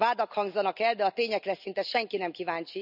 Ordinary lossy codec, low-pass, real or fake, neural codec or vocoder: none; 5.4 kHz; real; none